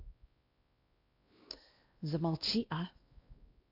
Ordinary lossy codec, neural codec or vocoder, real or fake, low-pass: AAC, 32 kbps; codec, 16 kHz, 1 kbps, X-Codec, WavLM features, trained on Multilingual LibriSpeech; fake; 5.4 kHz